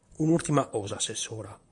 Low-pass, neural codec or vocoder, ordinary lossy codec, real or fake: 10.8 kHz; vocoder, 44.1 kHz, 128 mel bands, Pupu-Vocoder; MP3, 64 kbps; fake